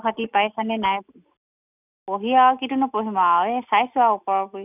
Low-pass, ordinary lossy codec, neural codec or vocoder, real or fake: 3.6 kHz; none; none; real